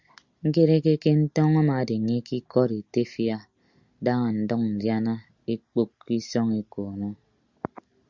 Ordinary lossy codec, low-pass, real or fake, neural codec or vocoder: Opus, 64 kbps; 7.2 kHz; real; none